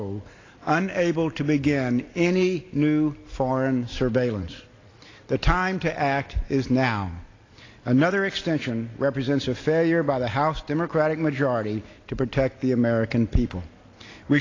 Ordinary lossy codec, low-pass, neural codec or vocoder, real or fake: AAC, 32 kbps; 7.2 kHz; none; real